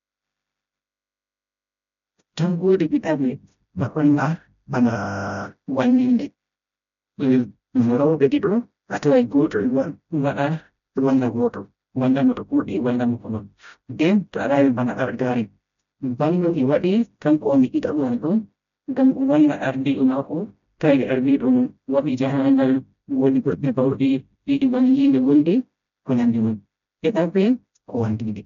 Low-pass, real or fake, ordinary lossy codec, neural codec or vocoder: 7.2 kHz; fake; none; codec, 16 kHz, 0.5 kbps, FreqCodec, smaller model